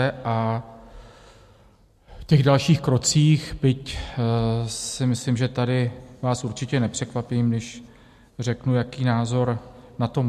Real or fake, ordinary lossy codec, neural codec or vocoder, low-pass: real; MP3, 64 kbps; none; 14.4 kHz